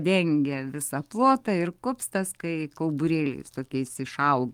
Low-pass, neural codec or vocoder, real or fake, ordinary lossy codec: 19.8 kHz; codec, 44.1 kHz, 7.8 kbps, DAC; fake; Opus, 32 kbps